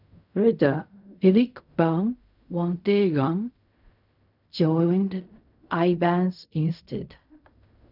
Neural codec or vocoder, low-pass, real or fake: codec, 16 kHz in and 24 kHz out, 0.4 kbps, LongCat-Audio-Codec, fine tuned four codebook decoder; 5.4 kHz; fake